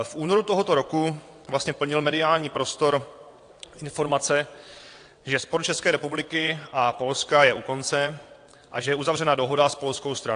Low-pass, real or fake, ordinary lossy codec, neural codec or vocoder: 9.9 kHz; fake; AAC, 48 kbps; vocoder, 22.05 kHz, 80 mel bands, WaveNeXt